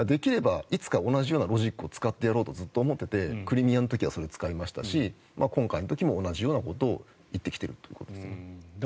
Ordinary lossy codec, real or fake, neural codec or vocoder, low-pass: none; real; none; none